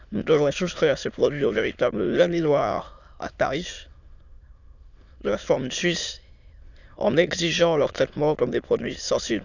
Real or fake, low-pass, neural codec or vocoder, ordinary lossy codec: fake; 7.2 kHz; autoencoder, 22.05 kHz, a latent of 192 numbers a frame, VITS, trained on many speakers; none